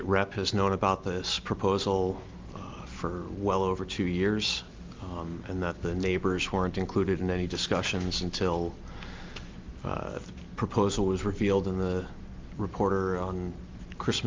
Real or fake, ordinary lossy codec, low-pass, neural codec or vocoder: real; Opus, 32 kbps; 7.2 kHz; none